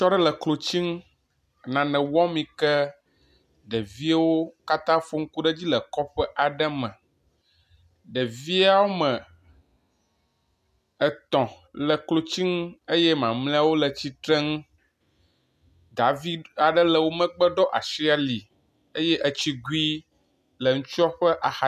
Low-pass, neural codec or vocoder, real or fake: 14.4 kHz; none; real